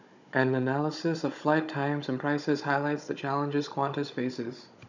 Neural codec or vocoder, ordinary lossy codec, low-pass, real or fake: codec, 16 kHz, 16 kbps, FunCodec, trained on Chinese and English, 50 frames a second; none; 7.2 kHz; fake